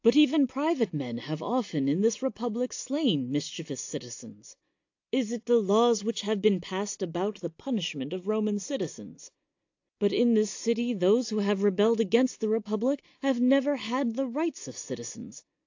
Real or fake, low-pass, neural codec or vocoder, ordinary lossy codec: real; 7.2 kHz; none; AAC, 48 kbps